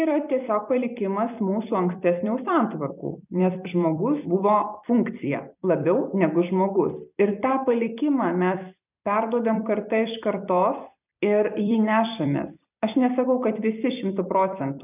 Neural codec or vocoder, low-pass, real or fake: vocoder, 44.1 kHz, 128 mel bands every 256 samples, BigVGAN v2; 3.6 kHz; fake